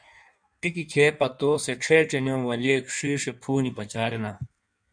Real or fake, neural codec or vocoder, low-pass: fake; codec, 16 kHz in and 24 kHz out, 1.1 kbps, FireRedTTS-2 codec; 9.9 kHz